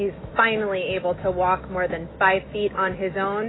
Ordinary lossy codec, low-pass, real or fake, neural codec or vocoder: AAC, 16 kbps; 7.2 kHz; fake; autoencoder, 48 kHz, 128 numbers a frame, DAC-VAE, trained on Japanese speech